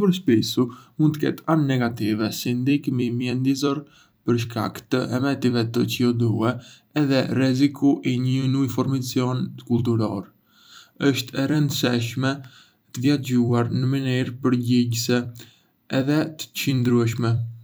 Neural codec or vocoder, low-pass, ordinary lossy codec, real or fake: vocoder, 44.1 kHz, 128 mel bands every 256 samples, BigVGAN v2; none; none; fake